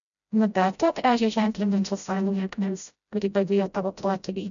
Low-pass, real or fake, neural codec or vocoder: 7.2 kHz; fake; codec, 16 kHz, 0.5 kbps, FreqCodec, smaller model